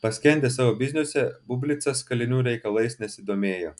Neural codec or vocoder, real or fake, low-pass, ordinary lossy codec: none; real; 10.8 kHz; MP3, 96 kbps